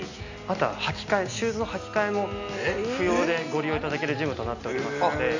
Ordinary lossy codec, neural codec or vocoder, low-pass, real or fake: none; none; 7.2 kHz; real